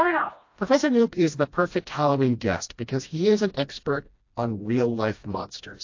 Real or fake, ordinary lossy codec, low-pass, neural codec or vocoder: fake; AAC, 48 kbps; 7.2 kHz; codec, 16 kHz, 1 kbps, FreqCodec, smaller model